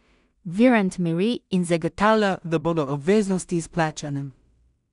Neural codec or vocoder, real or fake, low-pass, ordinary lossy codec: codec, 16 kHz in and 24 kHz out, 0.4 kbps, LongCat-Audio-Codec, two codebook decoder; fake; 10.8 kHz; none